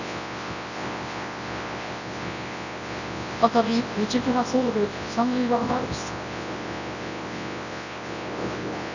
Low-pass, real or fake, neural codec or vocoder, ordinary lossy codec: 7.2 kHz; fake; codec, 24 kHz, 0.9 kbps, WavTokenizer, large speech release; none